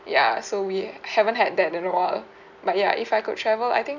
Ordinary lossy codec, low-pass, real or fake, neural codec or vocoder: none; 7.2 kHz; real; none